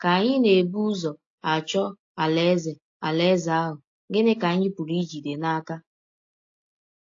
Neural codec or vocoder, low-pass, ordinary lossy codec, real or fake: none; 7.2 kHz; AAC, 48 kbps; real